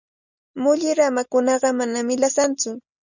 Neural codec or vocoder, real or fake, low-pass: none; real; 7.2 kHz